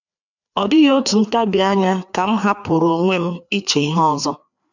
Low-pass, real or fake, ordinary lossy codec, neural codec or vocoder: 7.2 kHz; fake; none; codec, 16 kHz, 2 kbps, FreqCodec, larger model